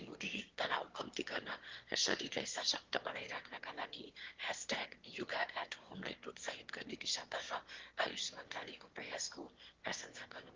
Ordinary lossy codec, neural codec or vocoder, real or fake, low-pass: Opus, 16 kbps; autoencoder, 22.05 kHz, a latent of 192 numbers a frame, VITS, trained on one speaker; fake; 7.2 kHz